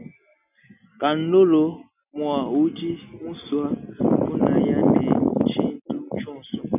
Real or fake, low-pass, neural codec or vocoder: real; 3.6 kHz; none